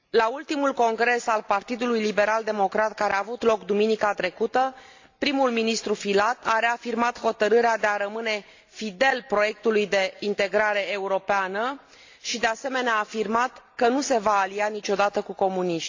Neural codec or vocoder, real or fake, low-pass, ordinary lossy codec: none; real; 7.2 kHz; AAC, 48 kbps